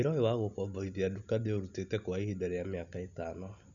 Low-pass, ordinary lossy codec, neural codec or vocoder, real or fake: 7.2 kHz; none; none; real